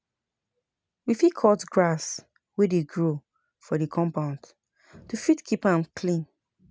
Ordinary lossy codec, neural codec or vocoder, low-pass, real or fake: none; none; none; real